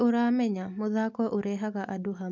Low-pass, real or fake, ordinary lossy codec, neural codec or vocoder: 7.2 kHz; real; MP3, 64 kbps; none